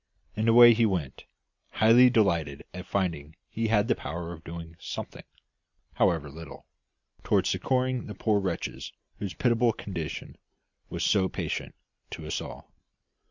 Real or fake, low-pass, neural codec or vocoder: real; 7.2 kHz; none